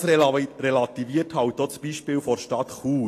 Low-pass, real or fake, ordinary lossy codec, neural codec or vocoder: 14.4 kHz; real; AAC, 48 kbps; none